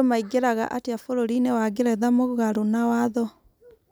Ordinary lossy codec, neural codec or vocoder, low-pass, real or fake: none; none; none; real